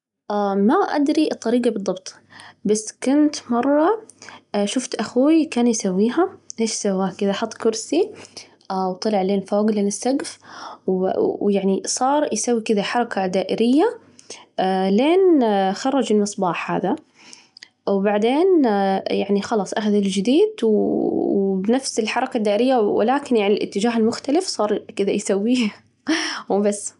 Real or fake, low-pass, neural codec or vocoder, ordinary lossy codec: real; 10.8 kHz; none; none